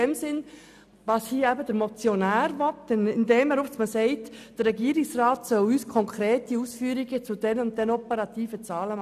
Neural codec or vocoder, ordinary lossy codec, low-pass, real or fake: none; none; 14.4 kHz; real